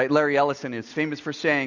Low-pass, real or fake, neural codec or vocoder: 7.2 kHz; real; none